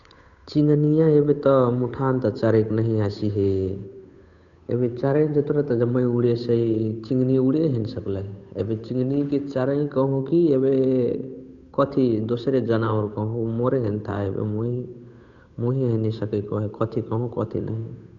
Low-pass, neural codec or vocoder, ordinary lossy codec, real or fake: 7.2 kHz; codec, 16 kHz, 8 kbps, FunCodec, trained on Chinese and English, 25 frames a second; none; fake